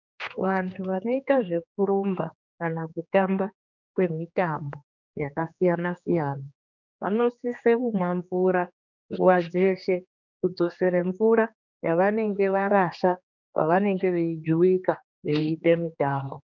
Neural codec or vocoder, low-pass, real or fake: codec, 16 kHz, 2 kbps, X-Codec, HuBERT features, trained on general audio; 7.2 kHz; fake